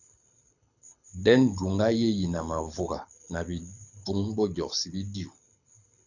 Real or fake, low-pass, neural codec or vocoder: fake; 7.2 kHz; codec, 24 kHz, 6 kbps, HILCodec